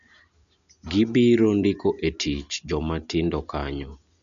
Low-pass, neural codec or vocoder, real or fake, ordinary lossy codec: 7.2 kHz; none; real; MP3, 96 kbps